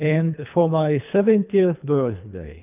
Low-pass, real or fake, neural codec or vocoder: 3.6 kHz; fake; codec, 24 kHz, 3 kbps, HILCodec